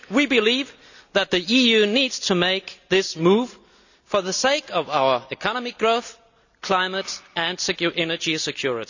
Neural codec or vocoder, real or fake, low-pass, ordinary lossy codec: none; real; 7.2 kHz; none